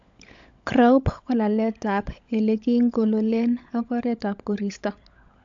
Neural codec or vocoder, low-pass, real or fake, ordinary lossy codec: codec, 16 kHz, 16 kbps, FunCodec, trained on LibriTTS, 50 frames a second; 7.2 kHz; fake; AAC, 64 kbps